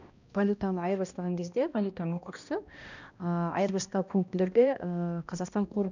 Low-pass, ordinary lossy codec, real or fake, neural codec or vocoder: 7.2 kHz; none; fake; codec, 16 kHz, 1 kbps, X-Codec, HuBERT features, trained on balanced general audio